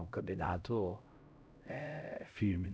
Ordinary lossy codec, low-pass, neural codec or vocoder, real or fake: none; none; codec, 16 kHz, 0.5 kbps, X-Codec, HuBERT features, trained on LibriSpeech; fake